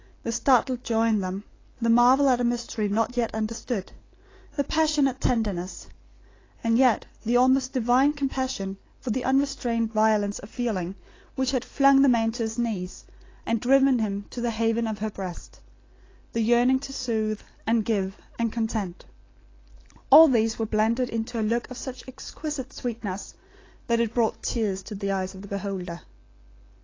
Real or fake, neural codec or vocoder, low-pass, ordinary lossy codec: real; none; 7.2 kHz; AAC, 32 kbps